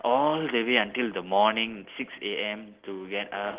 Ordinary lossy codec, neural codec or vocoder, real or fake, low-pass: Opus, 16 kbps; none; real; 3.6 kHz